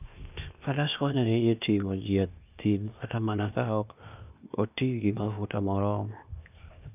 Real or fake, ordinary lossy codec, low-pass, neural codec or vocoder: fake; none; 3.6 kHz; codec, 16 kHz, 0.8 kbps, ZipCodec